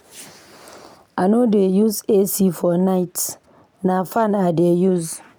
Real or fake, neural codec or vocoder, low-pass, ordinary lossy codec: real; none; none; none